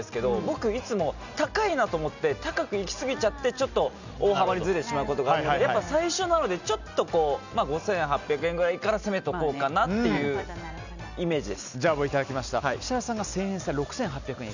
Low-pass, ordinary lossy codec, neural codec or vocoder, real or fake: 7.2 kHz; none; none; real